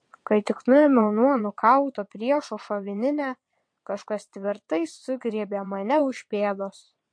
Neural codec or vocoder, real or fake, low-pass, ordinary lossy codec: vocoder, 22.05 kHz, 80 mel bands, WaveNeXt; fake; 9.9 kHz; MP3, 48 kbps